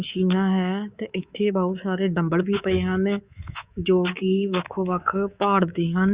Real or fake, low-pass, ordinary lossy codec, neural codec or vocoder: fake; 3.6 kHz; Opus, 64 kbps; codec, 24 kHz, 3.1 kbps, DualCodec